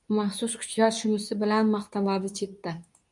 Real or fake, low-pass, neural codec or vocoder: fake; 10.8 kHz; codec, 24 kHz, 0.9 kbps, WavTokenizer, medium speech release version 2